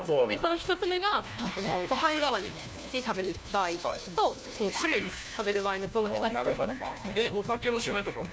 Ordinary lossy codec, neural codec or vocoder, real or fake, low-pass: none; codec, 16 kHz, 1 kbps, FunCodec, trained on LibriTTS, 50 frames a second; fake; none